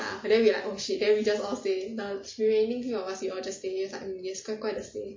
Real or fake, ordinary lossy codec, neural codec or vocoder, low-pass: real; MP3, 32 kbps; none; 7.2 kHz